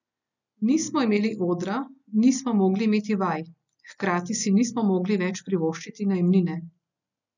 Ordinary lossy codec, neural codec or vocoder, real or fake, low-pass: none; none; real; 7.2 kHz